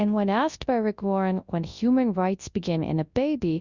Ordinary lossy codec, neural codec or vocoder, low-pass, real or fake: Opus, 64 kbps; codec, 24 kHz, 0.9 kbps, WavTokenizer, large speech release; 7.2 kHz; fake